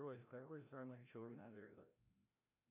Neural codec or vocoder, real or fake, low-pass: codec, 16 kHz, 0.5 kbps, FreqCodec, larger model; fake; 3.6 kHz